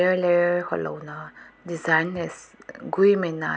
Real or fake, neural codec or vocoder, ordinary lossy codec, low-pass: real; none; none; none